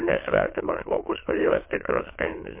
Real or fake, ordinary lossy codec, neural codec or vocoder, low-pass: fake; MP3, 24 kbps; autoencoder, 22.05 kHz, a latent of 192 numbers a frame, VITS, trained on many speakers; 3.6 kHz